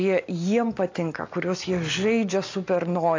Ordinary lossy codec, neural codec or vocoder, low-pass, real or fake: AAC, 48 kbps; none; 7.2 kHz; real